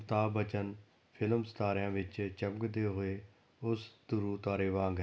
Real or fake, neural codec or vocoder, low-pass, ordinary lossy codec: real; none; none; none